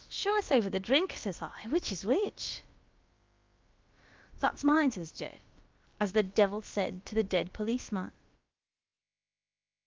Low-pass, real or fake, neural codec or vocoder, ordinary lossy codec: 7.2 kHz; fake; codec, 16 kHz, about 1 kbps, DyCAST, with the encoder's durations; Opus, 24 kbps